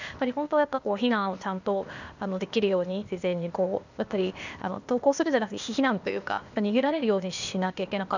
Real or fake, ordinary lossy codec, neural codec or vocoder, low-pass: fake; none; codec, 16 kHz, 0.8 kbps, ZipCodec; 7.2 kHz